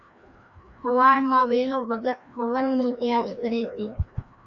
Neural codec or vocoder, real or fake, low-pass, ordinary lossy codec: codec, 16 kHz, 1 kbps, FreqCodec, larger model; fake; 7.2 kHz; AAC, 64 kbps